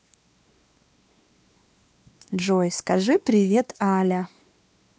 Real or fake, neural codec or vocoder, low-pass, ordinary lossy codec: fake; codec, 16 kHz, 2 kbps, X-Codec, WavLM features, trained on Multilingual LibriSpeech; none; none